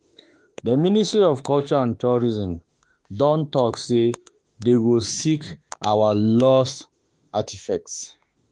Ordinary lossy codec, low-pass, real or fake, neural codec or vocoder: Opus, 24 kbps; 10.8 kHz; fake; autoencoder, 48 kHz, 32 numbers a frame, DAC-VAE, trained on Japanese speech